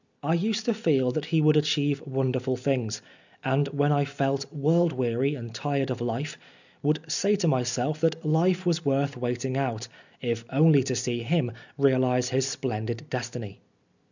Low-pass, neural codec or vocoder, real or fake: 7.2 kHz; none; real